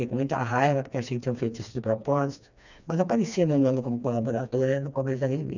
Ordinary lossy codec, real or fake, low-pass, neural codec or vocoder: none; fake; 7.2 kHz; codec, 16 kHz, 2 kbps, FreqCodec, smaller model